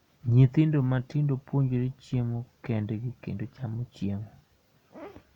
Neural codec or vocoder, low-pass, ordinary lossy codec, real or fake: none; 19.8 kHz; none; real